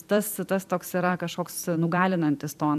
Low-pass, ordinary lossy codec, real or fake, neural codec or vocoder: 14.4 kHz; AAC, 96 kbps; fake; vocoder, 44.1 kHz, 128 mel bands every 256 samples, BigVGAN v2